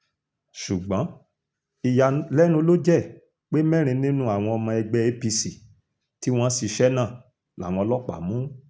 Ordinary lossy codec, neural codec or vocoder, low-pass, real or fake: none; none; none; real